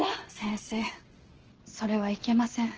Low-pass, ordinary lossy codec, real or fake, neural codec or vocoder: 7.2 kHz; Opus, 16 kbps; real; none